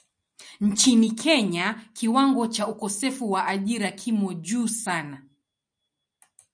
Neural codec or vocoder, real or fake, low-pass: none; real; 9.9 kHz